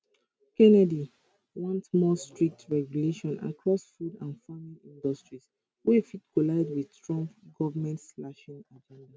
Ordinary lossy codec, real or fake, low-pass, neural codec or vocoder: none; real; none; none